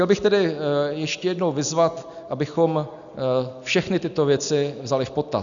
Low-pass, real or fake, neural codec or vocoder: 7.2 kHz; real; none